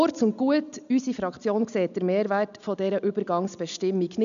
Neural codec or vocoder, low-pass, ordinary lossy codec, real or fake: none; 7.2 kHz; none; real